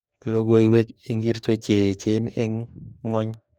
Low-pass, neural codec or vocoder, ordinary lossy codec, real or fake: 19.8 kHz; codec, 44.1 kHz, 2.6 kbps, DAC; none; fake